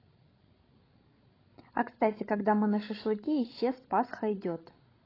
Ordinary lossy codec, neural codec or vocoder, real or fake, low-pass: AAC, 24 kbps; codec, 16 kHz, 16 kbps, FreqCodec, larger model; fake; 5.4 kHz